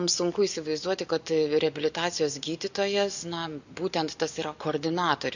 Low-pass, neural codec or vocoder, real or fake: 7.2 kHz; none; real